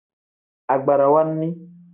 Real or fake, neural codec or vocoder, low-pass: real; none; 3.6 kHz